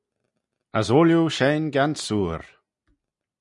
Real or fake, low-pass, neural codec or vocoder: real; 10.8 kHz; none